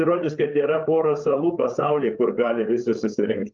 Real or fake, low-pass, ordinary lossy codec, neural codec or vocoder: fake; 7.2 kHz; Opus, 24 kbps; codec, 16 kHz, 8 kbps, FreqCodec, larger model